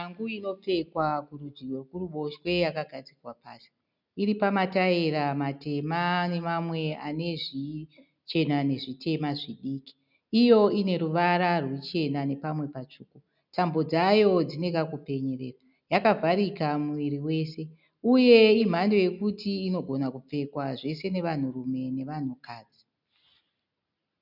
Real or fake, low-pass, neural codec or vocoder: real; 5.4 kHz; none